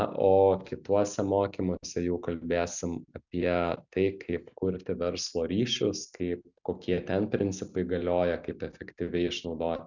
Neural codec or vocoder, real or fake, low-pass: none; real; 7.2 kHz